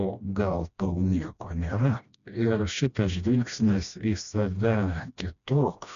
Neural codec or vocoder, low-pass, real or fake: codec, 16 kHz, 1 kbps, FreqCodec, smaller model; 7.2 kHz; fake